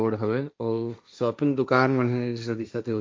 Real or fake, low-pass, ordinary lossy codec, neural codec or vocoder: fake; none; none; codec, 16 kHz, 1.1 kbps, Voila-Tokenizer